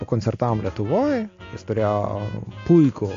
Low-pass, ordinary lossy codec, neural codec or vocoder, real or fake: 7.2 kHz; AAC, 48 kbps; none; real